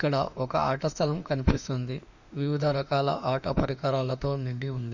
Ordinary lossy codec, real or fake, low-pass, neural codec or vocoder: none; fake; 7.2 kHz; autoencoder, 48 kHz, 32 numbers a frame, DAC-VAE, trained on Japanese speech